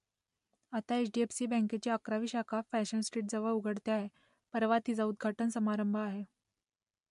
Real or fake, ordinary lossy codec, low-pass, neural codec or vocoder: real; MP3, 64 kbps; 10.8 kHz; none